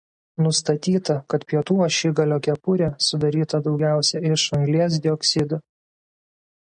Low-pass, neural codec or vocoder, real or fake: 9.9 kHz; none; real